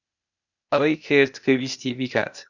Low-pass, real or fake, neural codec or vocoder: 7.2 kHz; fake; codec, 16 kHz, 0.8 kbps, ZipCodec